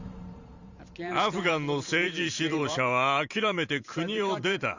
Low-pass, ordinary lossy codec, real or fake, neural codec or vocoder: 7.2 kHz; Opus, 64 kbps; fake; vocoder, 44.1 kHz, 128 mel bands every 512 samples, BigVGAN v2